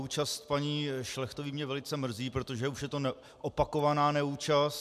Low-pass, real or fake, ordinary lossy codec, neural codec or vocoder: 14.4 kHz; real; MP3, 96 kbps; none